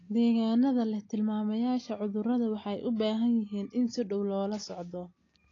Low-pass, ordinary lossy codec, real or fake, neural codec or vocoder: 7.2 kHz; AAC, 32 kbps; real; none